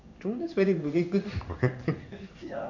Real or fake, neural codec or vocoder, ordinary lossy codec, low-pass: fake; codec, 16 kHz in and 24 kHz out, 1 kbps, XY-Tokenizer; none; 7.2 kHz